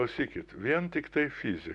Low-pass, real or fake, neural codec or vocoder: 10.8 kHz; real; none